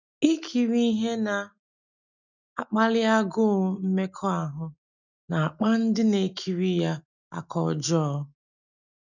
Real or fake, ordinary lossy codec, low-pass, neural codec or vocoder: real; none; 7.2 kHz; none